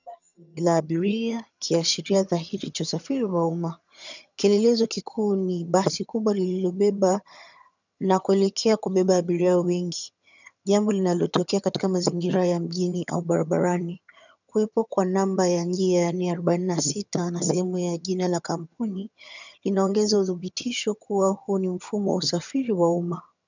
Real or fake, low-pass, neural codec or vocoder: fake; 7.2 kHz; vocoder, 22.05 kHz, 80 mel bands, HiFi-GAN